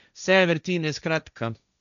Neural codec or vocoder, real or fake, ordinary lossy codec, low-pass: codec, 16 kHz, 1.1 kbps, Voila-Tokenizer; fake; none; 7.2 kHz